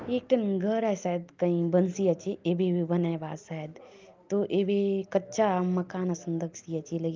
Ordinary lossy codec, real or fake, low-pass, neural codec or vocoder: Opus, 24 kbps; real; 7.2 kHz; none